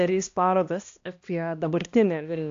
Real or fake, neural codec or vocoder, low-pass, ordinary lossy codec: fake; codec, 16 kHz, 1 kbps, X-Codec, HuBERT features, trained on balanced general audio; 7.2 kHz; AAC, 48 kbps